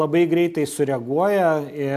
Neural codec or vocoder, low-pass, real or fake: none; 14.4 kHz; real